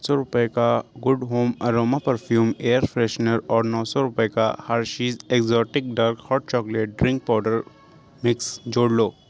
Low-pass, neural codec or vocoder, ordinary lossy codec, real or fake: none; none; none; real